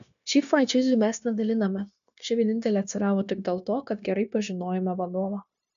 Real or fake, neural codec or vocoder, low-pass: fake; codec, 16 kHz, 0.9 kbps, LongCat-Audio-Codec; 7.2 kHz